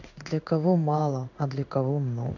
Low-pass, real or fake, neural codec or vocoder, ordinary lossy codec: 7.2 kHz; fake; codec, 16 kHz in and 24 kHz out, 1 kbps, XY-Tokenizer; none